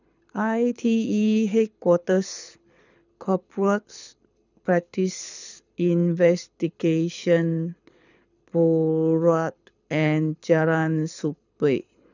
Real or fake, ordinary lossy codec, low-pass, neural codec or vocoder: fake; none; 7.2 kHz; codec, 24 kHz, 6 kbps, HILCodec